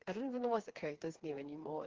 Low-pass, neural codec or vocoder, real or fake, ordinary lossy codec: 7.2 kHz; codec, 16 kHz, 4 kbps, FreqCodec, smaller model; fake; Opus, 16 kbps